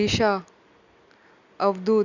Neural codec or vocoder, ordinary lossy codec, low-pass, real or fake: none; none; 7.2 kHz; real